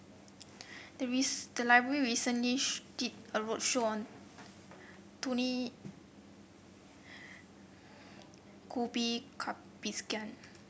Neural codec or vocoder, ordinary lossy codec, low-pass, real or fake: none; none; none; real